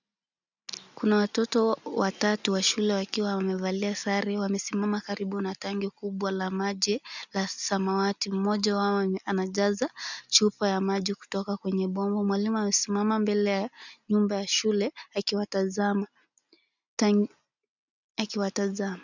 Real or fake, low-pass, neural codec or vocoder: real; 7.2 kHz; none